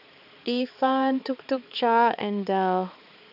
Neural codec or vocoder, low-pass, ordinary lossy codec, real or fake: codec, 16 kHz, 4 kbps, X-Codec, HuBERT features, trained on balanced general audio; 5.4 kHz; none; fake